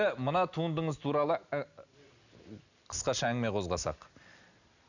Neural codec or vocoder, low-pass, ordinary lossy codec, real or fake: none; 7.2 kHz; none; real